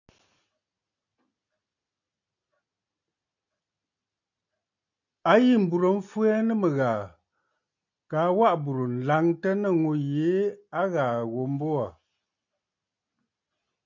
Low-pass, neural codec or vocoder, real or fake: 7.2 kHz; none; real